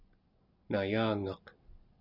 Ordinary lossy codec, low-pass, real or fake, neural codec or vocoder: Opus, 64 kbps; 5.4 kHz; real; none